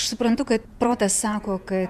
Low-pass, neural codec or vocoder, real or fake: 14.4 kHz; vocoder, 48 kHz, 128 mel bands, Vocos; fake